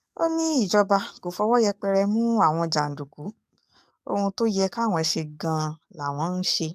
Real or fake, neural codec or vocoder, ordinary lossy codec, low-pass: fake; codec, 44.1 kHz, 7.8 kbps, DAC; AAC, 96 kbps; 14.4 kHz